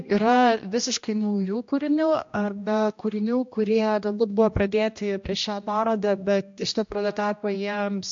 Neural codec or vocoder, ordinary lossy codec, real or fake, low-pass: codec, 16 kHz, 1 kbps, X-Codec, HuBERT features, trained on general audio; MP3, 48 kbps; fake; 7.2 kHz